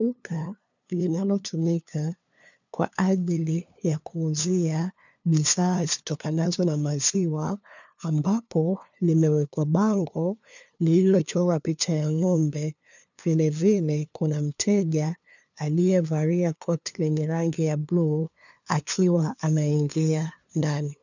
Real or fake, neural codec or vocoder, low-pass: fake; codec, 16 kHz, 2 kbps, FunCodec, trained on LibriTTS, 25 frames a second; 7.2 kHz